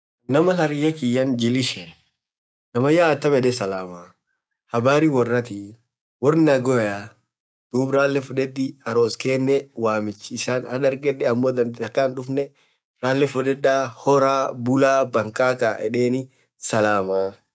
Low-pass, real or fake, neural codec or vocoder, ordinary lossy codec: none; fake; codec, 16 kHz, 6 kbps, DAC; none